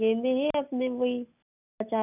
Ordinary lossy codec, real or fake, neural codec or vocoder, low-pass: none; real; none; 3.6 kHz